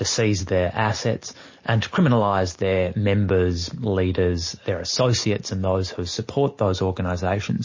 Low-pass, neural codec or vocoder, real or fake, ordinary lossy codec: 7.2 kHz; none; real; MP3, 32 kbps